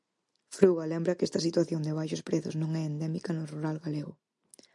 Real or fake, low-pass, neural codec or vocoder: real; 10.8 kHz; none